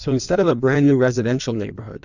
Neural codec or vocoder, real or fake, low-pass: codec, 16 kHz in and 24 kHz out, 1.1 kbps, FireRedTTS-2 codec; fake; 7.2 kHz